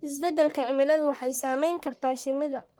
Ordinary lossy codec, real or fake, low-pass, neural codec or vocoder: none; fake; none; codec, 44.1 kHz, 1.7 kbps, Pupu-Codec